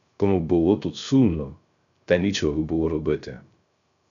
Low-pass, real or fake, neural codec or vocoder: 7.2 kHz; fake; codec, 16 kHz, 0.3 kbps, FocalCodec